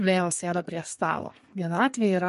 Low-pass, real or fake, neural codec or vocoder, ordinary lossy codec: 14.4 kHz; fake; codec, 32 kHz, 1.9 kbps, SNAC; MP3, 48 kbps